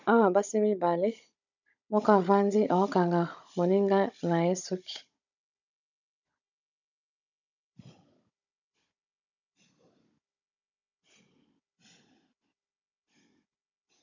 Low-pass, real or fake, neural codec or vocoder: 7.2 kHz; fake; codec, 16 kHz, 16 kbps, FunCodec, trained on Chinese and English, 50 frames a second